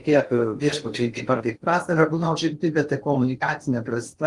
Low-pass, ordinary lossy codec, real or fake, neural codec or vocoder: 10.8 kHz; Opus, 24 kbps; fake; codec, 16 kHz in and 24 kHz out, 0.6 kbps, FocalCodec, streaming, 2048 codes